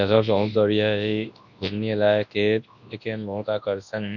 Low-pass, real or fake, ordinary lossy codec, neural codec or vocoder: 7.2 kHz; fake; none; codec, 24 kHz, 0.9 kbps, WavTokenizer, large speech release